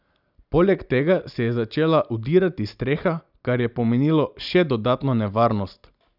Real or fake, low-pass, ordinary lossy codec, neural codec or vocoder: real; 5.4 kHz; none; none